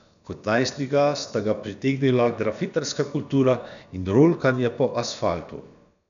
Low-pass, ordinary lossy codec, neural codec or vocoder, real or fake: 7.2 kHz; none; codec, 16 kHz, about 1 kbps, DyCAST, with the encoder's durations; fake